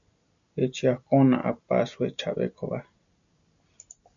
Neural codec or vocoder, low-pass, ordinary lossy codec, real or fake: none; 7.2 kHz; MP3, 96 kbps; real